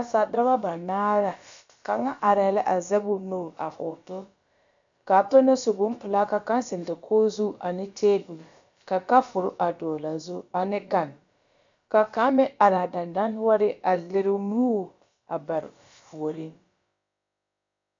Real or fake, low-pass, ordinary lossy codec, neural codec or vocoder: fake; 7.2 kHz; MP3, 64 kbps; codec, 16 kHz, about 1 kbps, DyCAST, with the encoder's durations